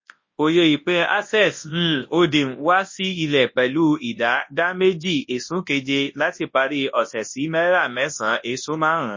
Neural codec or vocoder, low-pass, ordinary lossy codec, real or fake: codec, 24 kHz, 0.9 kbps, WavTokenizer, large speech release; 7.2 kHz; MP3, 32 kbps; fake